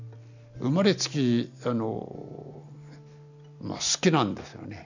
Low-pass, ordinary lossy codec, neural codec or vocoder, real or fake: 7.2 kHz; none; none; real